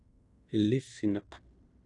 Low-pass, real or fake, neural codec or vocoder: 10.8 kHz; fake; codec, 16 kHz in and 24 kHz out, 0.9 kbps, LongCat-Audio-Codec, fine tuned four codebook decoder